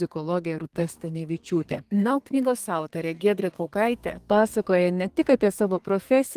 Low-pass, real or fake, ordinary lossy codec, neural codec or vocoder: 14.4 kHz; fake; Opus, 24 kbps; codec, 32 kHz, 1.9 kbps, SNAC